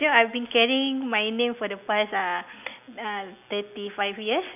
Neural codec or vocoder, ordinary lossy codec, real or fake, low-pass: none; none; real; 3.6 kHz